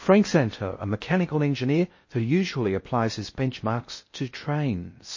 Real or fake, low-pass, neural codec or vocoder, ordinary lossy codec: fake; 7.2 kHz; codec, 16 kHz in and 24 kHz out, 0.6 kbps, FocalCodec, streaming, 2048 codes; MP3, 32 kbps